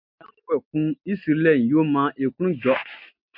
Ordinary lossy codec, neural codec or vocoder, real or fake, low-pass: MP3, 48 kbps; none; real; 5.4 kHz